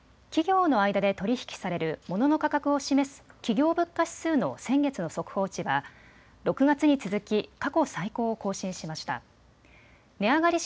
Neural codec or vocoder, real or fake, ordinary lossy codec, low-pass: none; real; none; none